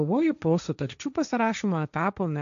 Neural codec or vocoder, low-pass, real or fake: codec, 16 kHz, 1.1 kbps, Voila-Tokenizer; 7.2 kHz; fake